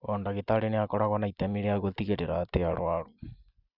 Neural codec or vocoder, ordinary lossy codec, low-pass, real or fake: none; Opus, 64 kbps; 5.4 kHz; real